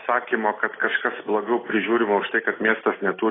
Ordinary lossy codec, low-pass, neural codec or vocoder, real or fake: AAC, 16 kbps; 7.2 kHz; none; real